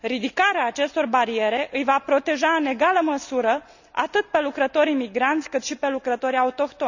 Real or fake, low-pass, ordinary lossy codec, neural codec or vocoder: real; 7.2 kHz; none; none